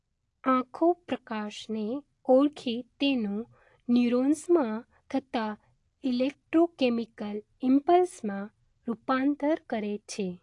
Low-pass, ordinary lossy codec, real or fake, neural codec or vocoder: 10.8 kHz; AAC, 48 kbps; real; none